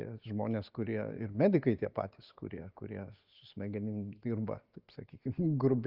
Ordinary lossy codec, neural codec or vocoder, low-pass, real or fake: Opus, 24 kbps; none; 5.4 kHz; real